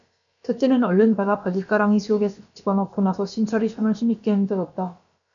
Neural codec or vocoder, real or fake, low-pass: codec, 16 kHz, about 1 kbps, DyCAST, with the encoder's durations; fake; 7.2 kHz